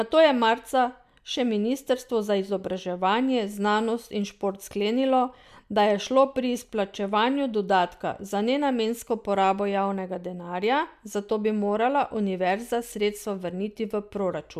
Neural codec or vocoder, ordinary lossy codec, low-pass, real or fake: none; MP3, 96 kbps; 14.4 kHz; real